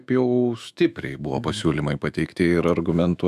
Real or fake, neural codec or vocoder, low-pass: fake; autoencoder, 48 kHz, 128 numbers a frame, DAC-VAE, trained on Japanese speech; 14.4 kHz